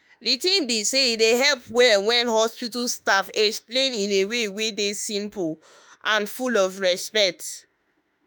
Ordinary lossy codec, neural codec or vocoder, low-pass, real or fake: none; autoencoder, 48 kHz, 32 numbers a frame, DAC-VAE, trained on Japanese speech; none; fake